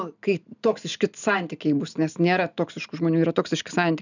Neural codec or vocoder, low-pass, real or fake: none; 7.2 kHz; real